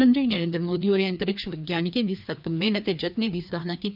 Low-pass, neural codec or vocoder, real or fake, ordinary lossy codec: 5.4 kHz; codec, 16 kHz, 2 kbps, FreqCodec, larger model; fake; none